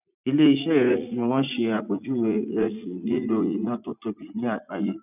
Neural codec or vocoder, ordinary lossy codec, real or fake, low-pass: vocoder, 44.1 kHz, 80 mel bands, Vocos; none; fake; 3.6 kHz